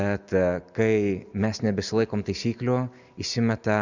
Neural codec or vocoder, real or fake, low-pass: none; real; 7.2 kHz